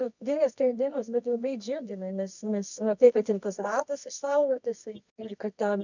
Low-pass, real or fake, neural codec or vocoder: 7.2 kHz; fake; codec, 24 kHz, 0.9 kbps, WavTokenizer, medium music audio release